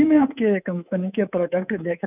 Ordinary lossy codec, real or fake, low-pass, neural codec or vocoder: none; fake; 3.6 kHz; codec, 24 kHz, 3.1 kbps, DualCodec